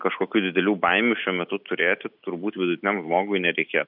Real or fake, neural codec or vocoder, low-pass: real; none; 5.4 kHz